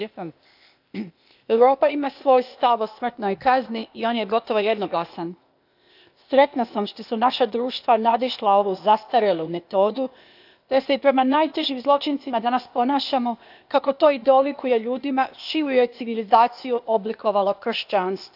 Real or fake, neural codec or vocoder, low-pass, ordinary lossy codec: fake; codec, 16 kHz, 0.8 kbps, ZipCodec; 5.4 kHz; none